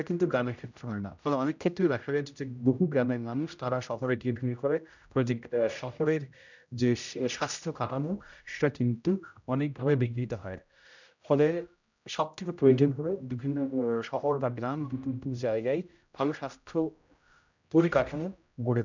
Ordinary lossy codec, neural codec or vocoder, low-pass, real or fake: none; codec, 16 kHz, 0.5 kbps, X-Codec, HuBERT features, trained on general audio; 7.2 kHz; fake